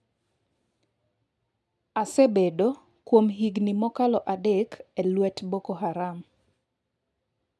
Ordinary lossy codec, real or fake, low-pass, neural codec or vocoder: none; real; none; none